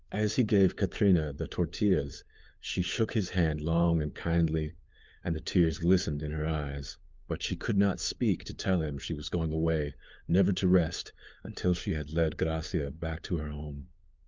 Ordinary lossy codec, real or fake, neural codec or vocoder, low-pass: Opus, 24 kbps; fake; codec, 16 kHz, 4 kbps, FunCodec, trained on LibriTTS, 50 frames a second; 7.2 kHz